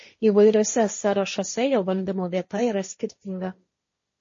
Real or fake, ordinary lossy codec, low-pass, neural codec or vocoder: fake; MP3, 32 kbps; 7.2 kHz; codec, 16 kHz, 1.1 kbps, Voila-Tokenizer